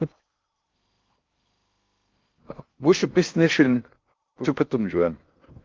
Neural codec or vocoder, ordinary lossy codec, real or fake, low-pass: codec, 16 kHz in and 24 kHz out, 0.6 kbps, FocalCodec, streaming, 2048 codes; Opus, 32 kbps; fake; 7.2 kHz